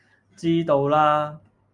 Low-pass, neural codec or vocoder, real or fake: 10.8 kHz; none; real